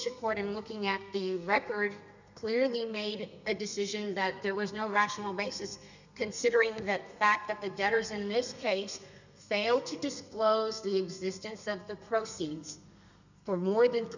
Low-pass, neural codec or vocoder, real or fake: 7.2 kHz; codec, 32 kHz, 1.9 kbps, SNAC; fake